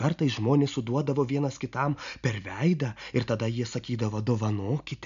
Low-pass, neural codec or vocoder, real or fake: 7.2 kHz; none; real